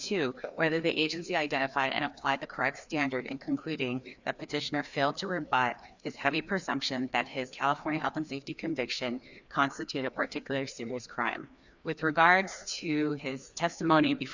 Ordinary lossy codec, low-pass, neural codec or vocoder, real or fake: Opus, 64 kbps; 7.2 kHz; codec, 16 kHz, 2 kbps, FreqCodec, larger model; fake